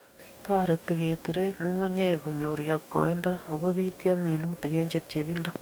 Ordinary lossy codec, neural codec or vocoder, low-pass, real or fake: none; codec, 44.1 kHz, 2.6 kbps, DAC; none; fake